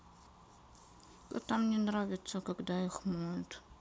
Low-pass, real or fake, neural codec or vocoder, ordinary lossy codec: none; real; none; none